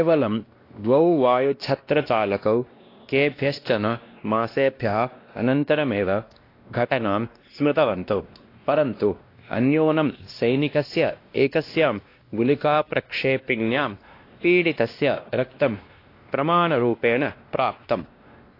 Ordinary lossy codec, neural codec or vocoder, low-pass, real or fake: AAC, 32 kbps; codec, 16 kHz, 1 kbps, X-Codec, WavLM features, trained on Multilingual LibriSpeech; 5.4 kHz; fake